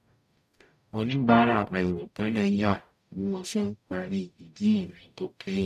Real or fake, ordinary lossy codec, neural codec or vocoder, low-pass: fake; none; codec, 44.1 kHz, 0.9 kbps, DAC; 14.4 kHz